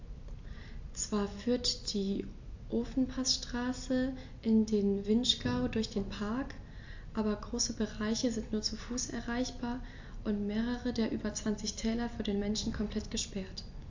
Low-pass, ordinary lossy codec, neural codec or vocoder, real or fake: 7.2 kHz; AAC, 48 kbps; none; real